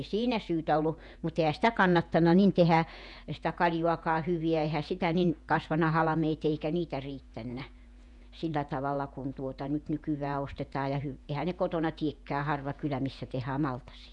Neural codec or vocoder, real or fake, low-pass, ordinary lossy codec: vocoder, 48 kHz, 128 mel bands, Vocos; fake; 10.8 kHz; none